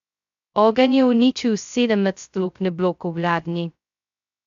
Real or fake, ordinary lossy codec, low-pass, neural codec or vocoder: fake; AAC, 64 kbps; 7.2 kHz; codec, 16 kHz, 0.2 kbps, FocalCodec